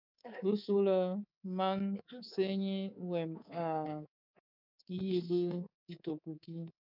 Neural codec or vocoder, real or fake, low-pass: codec, 24 kHz, 3.1 kbps, DualCodec; fake; 5.4 kHz